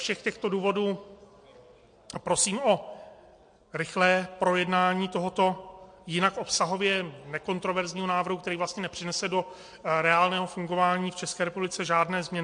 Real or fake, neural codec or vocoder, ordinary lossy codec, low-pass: real; none; MP3, 48 kbps; 9.9 kHz